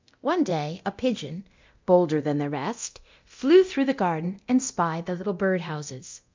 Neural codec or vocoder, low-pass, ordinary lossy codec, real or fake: codec, 24 kHz, 0.9 kbps, DualCodec; 7.2 kHz; MP3, 64 kbps; fake